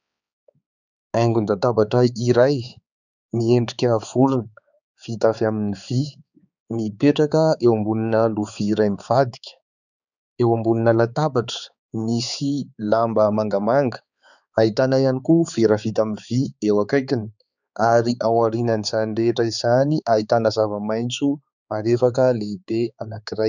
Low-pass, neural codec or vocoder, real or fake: 7.2 kHz; codec, 16 kHz, 4 kbps, X-Codec, HuBERT features, trained on balanced general audio; fake